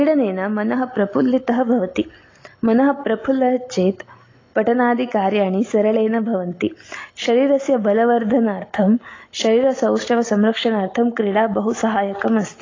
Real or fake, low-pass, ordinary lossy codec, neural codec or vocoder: real; 7.2 kHz; AAC, 32 kbps; none